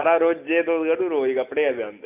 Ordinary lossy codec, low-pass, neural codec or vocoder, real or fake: none; 3.6 kHz; none; real